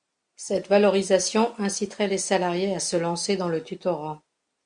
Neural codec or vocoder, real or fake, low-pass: none; real; 9.9 kHz